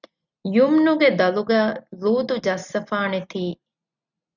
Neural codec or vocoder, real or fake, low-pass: none; real; 7.2 kHz